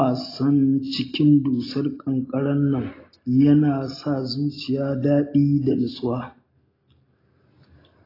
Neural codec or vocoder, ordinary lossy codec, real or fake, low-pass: none; AAC, 24 kbps; real; 5.4 kHz